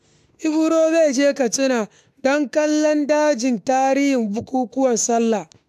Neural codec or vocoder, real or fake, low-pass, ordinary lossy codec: autoencoder, 48 kHz, 32 numbers a frame, DAC-VAE, trained on Japanese speech; fake; 14.4 kHz; AAC, 96 kbps